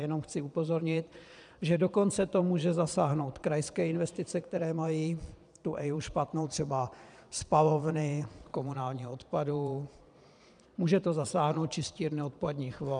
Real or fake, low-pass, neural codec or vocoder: fake; 9.9 kHz; vocoder, 22.05 kHz, 80 mel bands, Vocos